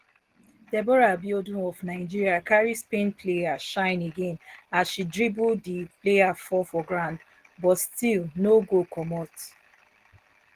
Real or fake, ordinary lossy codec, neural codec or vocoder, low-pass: real; Opus, 16 kbps; none; 14.4 kHz